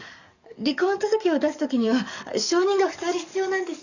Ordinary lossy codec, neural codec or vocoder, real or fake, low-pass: none; codec, 44.1 kHz, 7.8 kbps, DAC; fake; 7.2 kHz